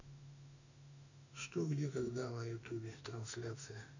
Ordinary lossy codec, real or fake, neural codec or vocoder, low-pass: none; fake; autoencoder, 48 kHz, 32 numbers a frame, DAC-VAE, trained on Japanese speech; 7.2 kHz